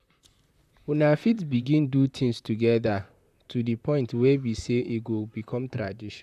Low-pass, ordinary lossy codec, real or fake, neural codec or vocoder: 14.4 kHz; none; fake; vocoder, 44.1 kHz, 128 mel bands, Pupu-Vocoder